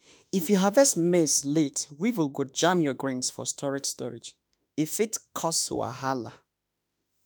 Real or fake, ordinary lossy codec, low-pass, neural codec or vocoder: fake; none; none; autoencoder, 48 kHz, 32 numbers a frame, DAC-VAE, trained on Japanese speech